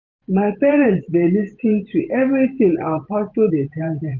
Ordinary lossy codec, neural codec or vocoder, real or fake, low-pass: none; vocoder, 44.1 kHz, 128 mel bands every 512 samples, BigVGAN v2; fake; 7.2 kHz